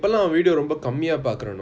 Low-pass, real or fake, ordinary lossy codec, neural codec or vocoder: none; real; none; none